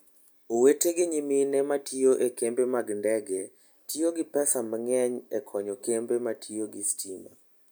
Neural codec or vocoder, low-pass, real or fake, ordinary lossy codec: none; none; real; none